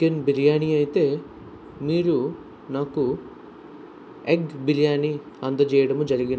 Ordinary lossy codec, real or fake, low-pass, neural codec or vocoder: none; real; none; none